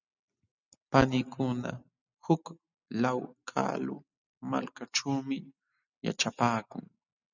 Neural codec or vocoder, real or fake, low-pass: none; real; 7.2 kHz